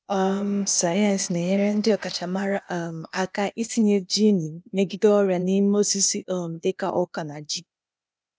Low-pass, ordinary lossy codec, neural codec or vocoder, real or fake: none; none; codec, 16 kHz, 0.8 kbps, ZipCodec; fake